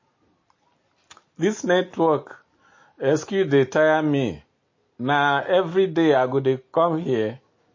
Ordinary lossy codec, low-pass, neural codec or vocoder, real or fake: MP3, 32 kbps; 7.2 kHz; none; real